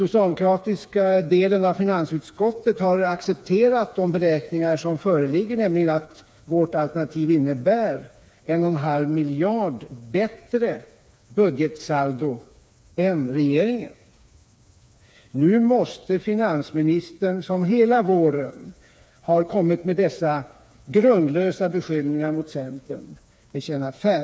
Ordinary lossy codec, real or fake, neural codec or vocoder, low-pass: none; fake; codec, 16 kHz, 4 kbps, FreqCodec, smaller model; none